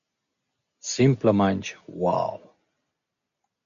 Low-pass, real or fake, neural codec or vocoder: 7.2 kHz; real; none